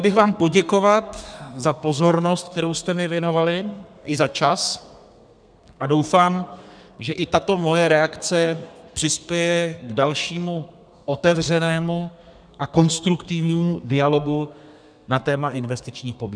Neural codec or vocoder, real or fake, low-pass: codec, 32 kHz, 1.9 kbps, SNAC; fake; 9.9 kHz